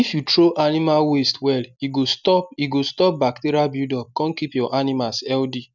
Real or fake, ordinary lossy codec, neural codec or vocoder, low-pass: real; none; none; 7.2 kHz